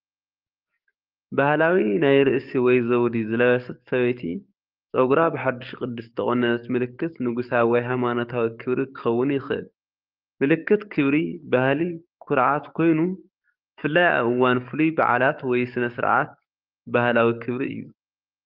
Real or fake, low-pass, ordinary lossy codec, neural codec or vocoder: fake; 5.4 kHz; Opus, 32 kbps; codec, 44.1 kHz, 7.8 kbps, DAC